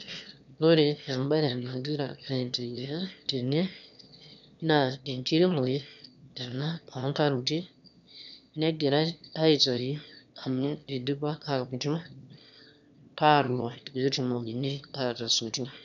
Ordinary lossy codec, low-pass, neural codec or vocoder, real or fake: none; 7.2 kHz; autoencoder, 22.05 kHz, a latent of 192 numbers a frame, VITS, trained on one speaker; fake